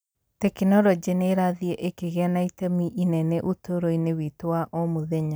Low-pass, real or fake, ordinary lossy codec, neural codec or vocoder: none; real; none; none